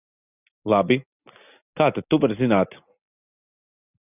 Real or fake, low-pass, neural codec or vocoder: real; 3.6 kHz; none